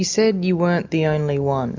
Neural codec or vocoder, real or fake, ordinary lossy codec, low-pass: none; real; MP3, 64 kbps; 7.2 kHz